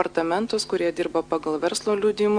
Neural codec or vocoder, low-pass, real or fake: none; 9.9 kHz; real